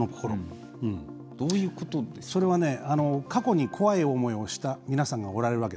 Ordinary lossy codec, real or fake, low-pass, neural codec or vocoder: none; real; none; none